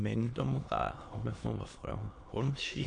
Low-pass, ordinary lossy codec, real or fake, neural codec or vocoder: 9.9 kHz; AAC, 48 kbps; fake; autoencoder, 22.05 kHz, a latent of 192 numbers a frame, VITS, trained on many speakers